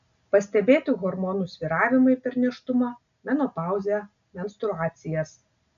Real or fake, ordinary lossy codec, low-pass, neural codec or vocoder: real; MP3, 96 kbps; 7.2 kHz; none